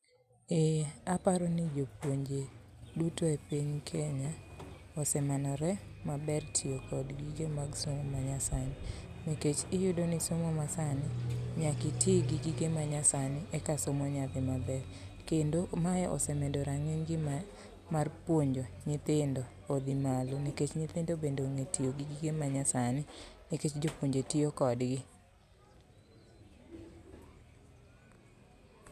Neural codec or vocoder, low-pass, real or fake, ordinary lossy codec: none; 14.4 kHz; real; none